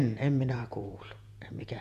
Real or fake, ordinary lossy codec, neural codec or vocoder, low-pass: fake; none; vocoder, 48 kHz, 128 mel bands, Vocos; 14.4 kHz